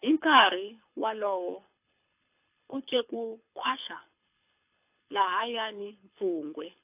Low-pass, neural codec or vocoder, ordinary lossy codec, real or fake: 3.6 kHz; codec, 24 kHz, 6 kbps, HILCodec; AAC, 32 kbps; fake